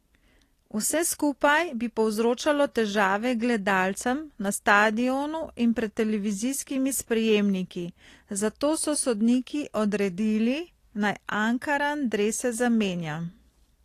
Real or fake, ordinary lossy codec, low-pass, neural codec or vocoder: real; AAC, 48 kbps; 14.4 kHz; none